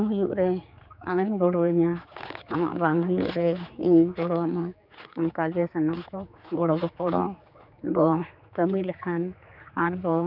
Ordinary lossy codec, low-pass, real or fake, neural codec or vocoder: none; 5.4 kHz; fake; codec, 16 kHz, 4 kbps, X-Codec, HuBERT features, trained on general audio